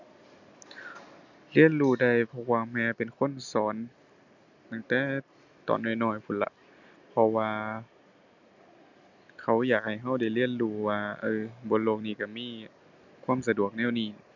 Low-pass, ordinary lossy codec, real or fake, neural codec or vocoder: 7.2 kHz; none; real; none